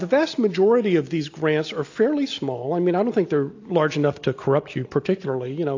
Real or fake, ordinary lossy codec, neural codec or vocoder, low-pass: real; AAC, 48 kbps; none; 7.2 kHz